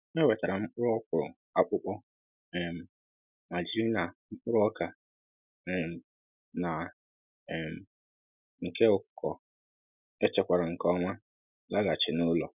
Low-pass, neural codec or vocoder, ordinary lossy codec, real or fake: 3.6 kHz; none; none; real